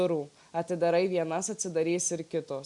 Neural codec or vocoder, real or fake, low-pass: none; real; 10.8 kHz